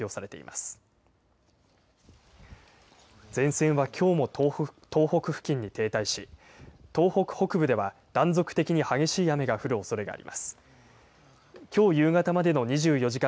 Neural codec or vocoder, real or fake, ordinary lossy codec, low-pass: none; real; none; none